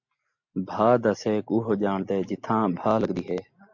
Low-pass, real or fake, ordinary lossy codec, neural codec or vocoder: 7.2 kHz; real; MP3, 48 kbps; none